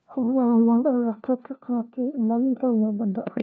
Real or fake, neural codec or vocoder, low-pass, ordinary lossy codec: fake; codec, 16 kHz, 1 kbps, FunCodec, trained on LibriTTS, 50 frames a second; none; none